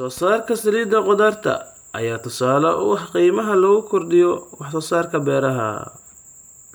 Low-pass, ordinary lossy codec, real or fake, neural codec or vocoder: none; none; real; none